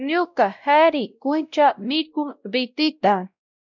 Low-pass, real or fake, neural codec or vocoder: 7.2 kHz; fake; codec, 16 kHz, 0.5 kbps, X-Codec, WavLM features, trained on Multilingual LibriSpeech